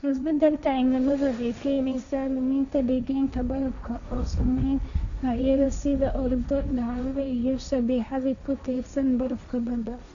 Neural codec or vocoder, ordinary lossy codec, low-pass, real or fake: codec, 16 kHz, 1.1 kbps, Voila-Tokenizer; none; 7.2 kHz; fake